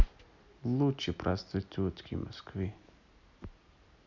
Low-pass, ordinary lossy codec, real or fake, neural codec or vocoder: 7.2 kHz; none; real; none